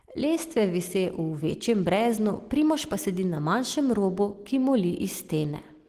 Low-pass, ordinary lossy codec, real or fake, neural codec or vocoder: 14.4 kHz; Opus, 16 kbps; real; none